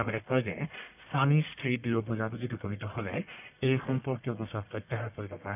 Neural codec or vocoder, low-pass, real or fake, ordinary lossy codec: codec, 44.1 kHz, 1.7 kbps, Pupu-Codec; 3.6 kHz; fake; none